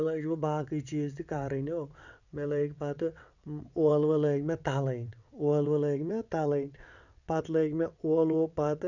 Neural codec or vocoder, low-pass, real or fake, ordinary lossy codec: vocoder, 22.05 kHz, 80 mel bands, WaveNeXt; 7.2 kHz; fake; none